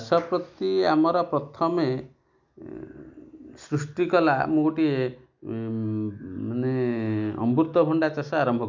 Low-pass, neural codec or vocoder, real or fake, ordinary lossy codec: 7.2 kHz; none; real; MP3, 64 kbps